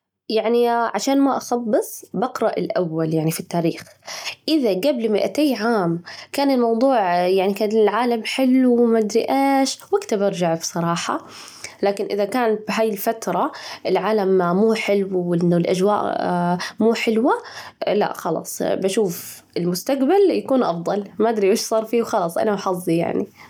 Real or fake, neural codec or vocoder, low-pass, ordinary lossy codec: real; none; 19.8 kHz; none